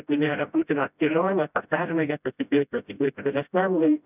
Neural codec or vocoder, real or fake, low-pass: codec, 16 kHz, 0.5 kbps, FreqCodec, smaller model; fake; 3.6 kHz